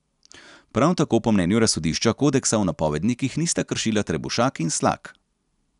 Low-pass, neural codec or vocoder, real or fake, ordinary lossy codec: 10.8 kHz; none; real; none